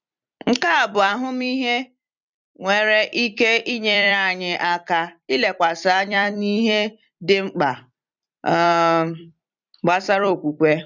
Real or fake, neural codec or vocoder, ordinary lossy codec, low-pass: fake; vocoder, 44.1 kHz, 128 mel bands every 256 samples, BigVGAN v2; none; 7.2 kHz